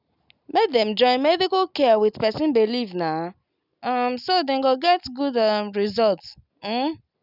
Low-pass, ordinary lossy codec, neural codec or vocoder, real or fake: 5.4 kHz; none; none; real